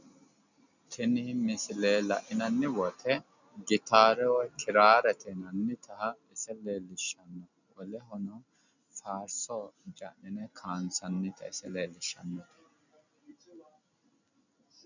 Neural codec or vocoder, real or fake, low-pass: none; real; 7.2 kHz